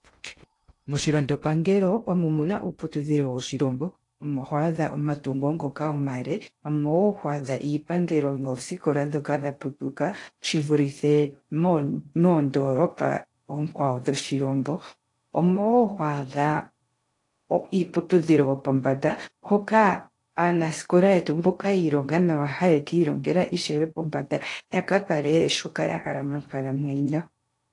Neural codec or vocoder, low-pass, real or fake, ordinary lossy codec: codec, 16 kHz in and 24 kHz out, 0.6 kbps, FocalCodec, streaming, 2048 codes; 10.8 kHz; fake; AAC, 48 kbps